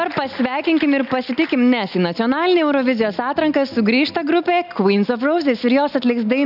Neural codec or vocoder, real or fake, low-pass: none; real; 5.4 kHz